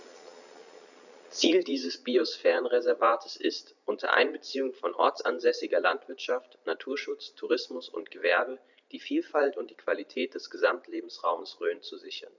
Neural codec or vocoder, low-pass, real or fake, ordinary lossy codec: vocoder, 22.05 kHz, 80 mel bands, Vocos; 7.2 kHz; fake; none